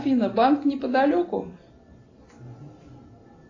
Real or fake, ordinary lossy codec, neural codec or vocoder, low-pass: real; AAC, 32 kbps; none; 7.2 kHz